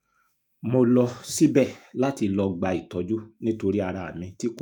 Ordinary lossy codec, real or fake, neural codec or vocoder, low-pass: none; fake; autoencoder, 48 kHz, 128 numbers a frame, DAC-VAE, trained on Japanese speech; 19.8 kHz